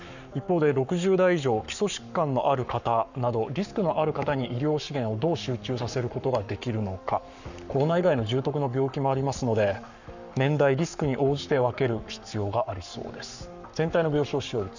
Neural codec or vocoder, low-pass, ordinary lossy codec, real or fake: codec, 44.1 kHz, 7.8 kbps, Pupu-Codec; 7.2 kHz; none; fake